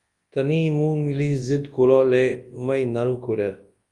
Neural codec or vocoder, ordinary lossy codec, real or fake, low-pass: codec, 24 kHz, 0.9 kbps, WavTokenizer, large speech release; Opus, 32 kbps; fake; 10.8 kHz